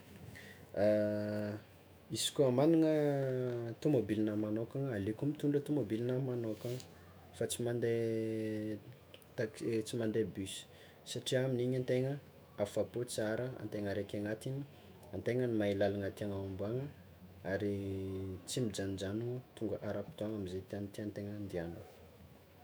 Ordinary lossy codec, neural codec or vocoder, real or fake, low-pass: none; autoencoder, 48 kHz, 128 numbers a frame, DAC-VAE, trained on Japanese speech; fake; none